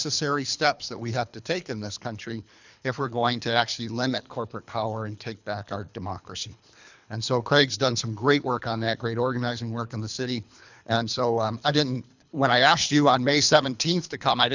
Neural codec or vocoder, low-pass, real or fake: codec, 24 kHz, 3 kbps, HILCodec; 7.2 kHz; fake